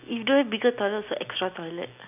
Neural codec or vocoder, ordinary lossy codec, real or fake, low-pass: none; none; real; 3.6 kHz